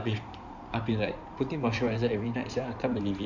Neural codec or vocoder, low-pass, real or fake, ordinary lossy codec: codec, 16 kHz in and 24 kHz out, 2.2 kbps, FireRedTTS-2 codec; 7.2 kHz; fake; none